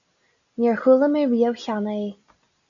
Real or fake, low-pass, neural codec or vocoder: real; 7.2 kHz; none